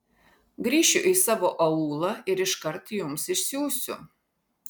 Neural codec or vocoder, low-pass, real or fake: none; 19.8 kHz; real